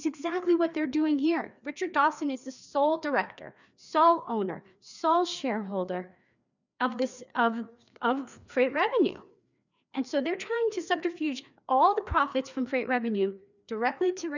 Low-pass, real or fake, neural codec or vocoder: 7.2 kHz; fake; codec, 16 kHz, 2 kbps, FreqCodec, larger model